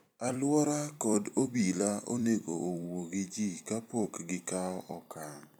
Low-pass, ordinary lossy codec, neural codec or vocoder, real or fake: none; none; vocoder, 44.1 kHz, 128 mel bands every 256 samples, BigVGAN v2; fake